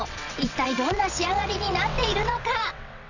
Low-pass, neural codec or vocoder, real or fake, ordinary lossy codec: 7.2 kHz; vocoder, 22.05 kHz, 80 mel bands, WaveNeXt; fake; none